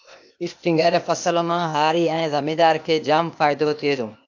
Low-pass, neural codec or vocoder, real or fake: 7.2 kHz; codec, 16 kHz, 0.8 kbps, ZipCodec; fake